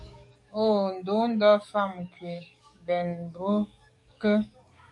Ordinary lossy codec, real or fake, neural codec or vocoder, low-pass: AAC, 64 kbps; fake; codec, 44.1 kHz, 7.8 kbps, DAC; 10.8 kHz